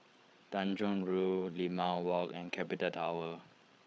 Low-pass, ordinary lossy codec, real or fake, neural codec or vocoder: none; none; fake; codec, 16 kHz, 8 kbps, FreqCodec, larger model